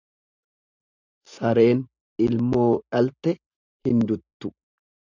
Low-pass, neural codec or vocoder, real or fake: 7.2 kHz; none; real